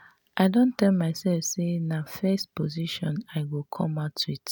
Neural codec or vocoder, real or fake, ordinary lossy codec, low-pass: none; real; none; none